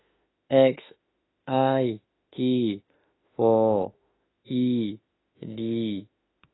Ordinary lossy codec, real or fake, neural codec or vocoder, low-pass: AAC, 16 kbps; fake; autoencoder, 48 kHz, 32 numbers a frame, DAC-VAE, trained on Japanese speech; 7.2 kHz